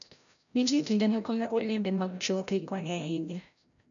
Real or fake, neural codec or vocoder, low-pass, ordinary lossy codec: fake; codec, 16 kHz, 0.5 kbps, FreqCodec, larger model; 7.2 kHz; none